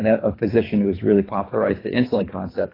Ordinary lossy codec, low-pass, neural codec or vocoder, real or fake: AAC, 24 kbps; 5.4 kHz; codec, 24 kHz, 3 kbps, HILCodec; fake